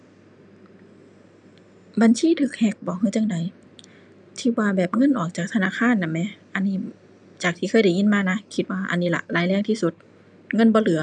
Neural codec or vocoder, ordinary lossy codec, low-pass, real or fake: none; none; 9.9 kHz; real